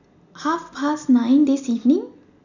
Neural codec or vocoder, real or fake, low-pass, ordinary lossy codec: none; real; 7.2 kHz; none